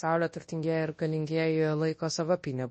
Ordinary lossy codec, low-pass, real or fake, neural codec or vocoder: MP3, 32 kbps; 10.8 kHz; fake; codec, 24 kHz, 0.9 kbps, WavTokenizer, large speech release